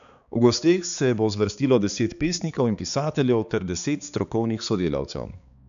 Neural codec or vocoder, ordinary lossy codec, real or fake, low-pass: codec, 16 kHz, 4 kbps, X-Codec, HuBERT features, trained on balanced general audio; none; fake; 7.2 kHz